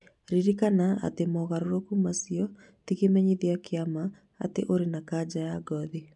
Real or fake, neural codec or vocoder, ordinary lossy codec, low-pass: real; none; none; 10.8 kHz